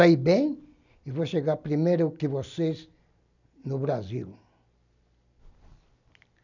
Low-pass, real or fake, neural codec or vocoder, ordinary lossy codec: 7.2 kHz; real; none; none